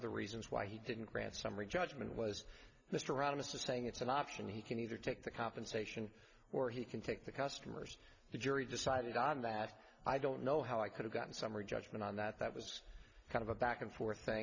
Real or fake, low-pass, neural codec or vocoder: real; 7.2 kHz; none